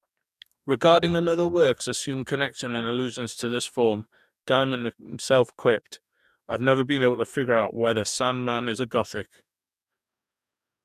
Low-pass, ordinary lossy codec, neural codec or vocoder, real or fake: 14.4 kHz; none; codec, 44.1 kHz, 2.6 kbps, DAC; fake